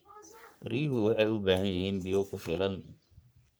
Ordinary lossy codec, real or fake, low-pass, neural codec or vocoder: none; fake; none; codec, 44.1 kHz, 3.4 kbps, Pupu-Codec